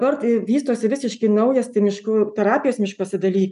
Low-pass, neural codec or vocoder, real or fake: 10.8 kHz; none; real